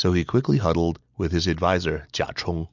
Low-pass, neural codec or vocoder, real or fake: 7.2 kHz; none; real